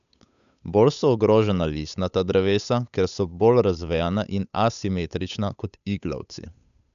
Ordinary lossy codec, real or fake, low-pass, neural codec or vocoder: none; fake; 7.2 kHz; codec, 16 kHz, 8 kbps, FunCodec, trained on Chinese and English, 25 frames a second